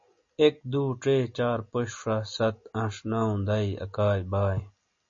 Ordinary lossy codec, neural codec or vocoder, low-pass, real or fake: MP3, 32 kbps; none; 7.2 kHz; real